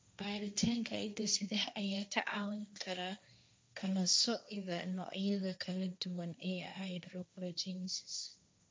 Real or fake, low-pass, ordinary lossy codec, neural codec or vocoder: fake; none; none; codec, 16 kHz, 1.1 kbps, Voila-Tokenizer